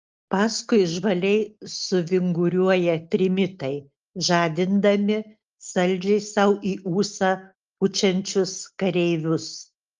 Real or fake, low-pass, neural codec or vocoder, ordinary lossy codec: real; 7.2 kHz; none; Opus, 24 kbps